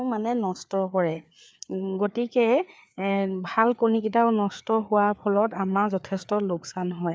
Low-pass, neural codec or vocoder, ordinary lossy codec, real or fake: none; codec, 16 kHz, 4 kbps, FreqCodec, larger model; none; fake